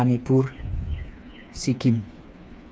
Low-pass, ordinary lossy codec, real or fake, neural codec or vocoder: none; none; fake; codec, 16 kHz, 4 kbps, FreqCodec, smaller model